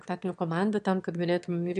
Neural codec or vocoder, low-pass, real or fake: autoencoder, 22.05 kHz, a latent of 192 numbers a frame, VITS, trained on one speaker; 9.9 kHz; fake